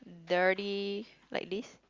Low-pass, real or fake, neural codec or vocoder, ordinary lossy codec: 7.2 kHz; real; none; Opus, 32 kbps